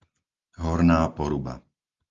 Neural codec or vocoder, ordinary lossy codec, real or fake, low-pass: none; Opus, 32 kbps; real; 7.2 kHz